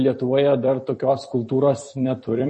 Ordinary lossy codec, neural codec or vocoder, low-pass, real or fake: MP3, 32 kbps; vocoder, 44.1 kHz, 128 mel bands every 256 samples, BigVGAN v2; 10.8 kHz; fake